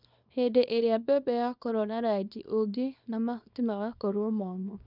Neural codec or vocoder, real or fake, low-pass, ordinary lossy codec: codec, 24 kHz, 0.9 kbps, WavTokenizer, small release; fake; 5.4 kHz; none